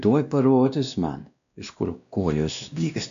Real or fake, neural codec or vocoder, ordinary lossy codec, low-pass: fake; codec, 16 kHz, 1 kbps, X-Codec, WavLM features, trained on Multilingual LibriSpeech; AAC, 96 kbps; 7.2 kHz